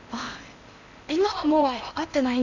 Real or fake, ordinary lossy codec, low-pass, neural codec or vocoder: fake; none; 7.2 kHz; codec, 16 kHz in and 24 kHz out, 0.8 kbps, FocalCodec, streaming, 65536 codes